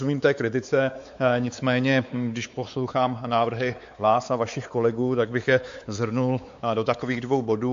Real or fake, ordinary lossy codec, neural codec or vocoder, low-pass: fake; AAC, 64 kbps; codec, 16 kHz, 4 kbps, X-Codec, WavLM features, trained on Multilingual LibriSpeech; 7.2 kHz